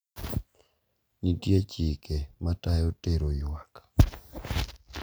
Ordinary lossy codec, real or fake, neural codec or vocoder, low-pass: none; real; none; none